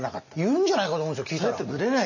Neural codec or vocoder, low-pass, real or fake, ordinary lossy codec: none; 7.2 kHz; real; none